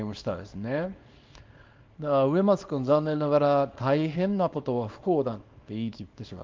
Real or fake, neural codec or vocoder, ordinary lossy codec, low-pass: fake; codec, 24 kHz, 0.9 kbps, WavTokenizer, small release; Opus, 24 kbps; 7.2 kHz